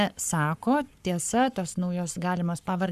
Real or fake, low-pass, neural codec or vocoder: fake; 14.4 kHz; codec, 44.1 kHz, 7.8 kbps, Pupu-Codec